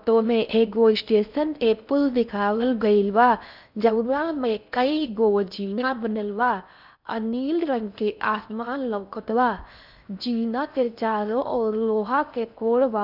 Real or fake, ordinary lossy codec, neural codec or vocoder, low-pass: fake; none; codec, 16 kHz in and 24 kHz out, 0.6 kbps, FocalCodec, streaming, 4096 codes; 5.4 kHz